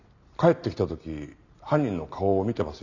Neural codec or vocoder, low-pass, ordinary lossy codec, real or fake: none; 7.2 kHz; none; real